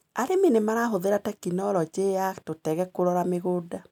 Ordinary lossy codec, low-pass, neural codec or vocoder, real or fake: MP3, 96 kbps; 19.8 kHz; none; real